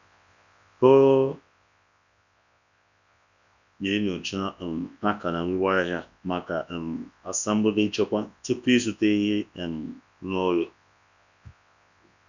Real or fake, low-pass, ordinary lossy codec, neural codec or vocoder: fake; 7.2 kHz; none; codec, 24 kHz, 0.9 kbps, WavTokenizer, large speech release